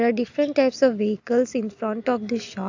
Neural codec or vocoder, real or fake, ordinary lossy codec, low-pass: none; real; AAC, 48 kbps; 7.2 kHz